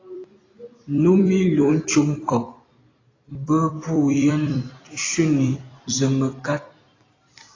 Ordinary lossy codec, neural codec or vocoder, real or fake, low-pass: AAC, 32 kbps; vocoder, 44.1 kHz, 128 mel bands every 256 samples, BigVGAN v2; fake; 7.2 kHz